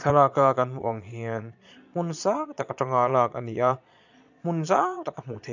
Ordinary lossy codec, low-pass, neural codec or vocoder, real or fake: none; 7.2 kHz; vocoder, 22.05 kHz, 80 mel bands, Vocos; fake